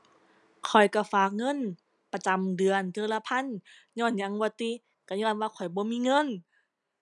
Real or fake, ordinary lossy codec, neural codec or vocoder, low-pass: real; none; none; 10.8 kHz